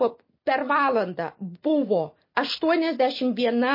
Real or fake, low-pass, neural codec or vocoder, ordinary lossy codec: real; 5.4 kHz; none; MP3, 24 kbps